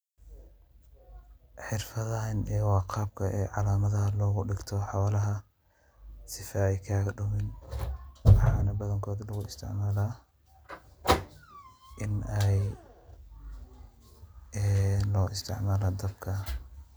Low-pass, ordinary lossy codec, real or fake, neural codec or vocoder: none; none; real; none